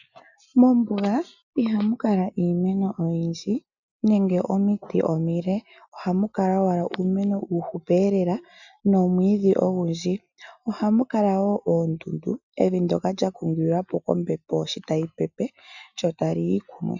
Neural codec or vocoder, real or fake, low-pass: none; real; 7.2 kHz